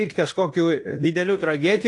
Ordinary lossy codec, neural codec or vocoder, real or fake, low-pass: AAC, 48 kbps; codec, 16 kHz in and 24 kHz out, 0.9 kbps, LongCat-Audio-Codec, fine tuned four codebook decoder; fake; 10.8 kHz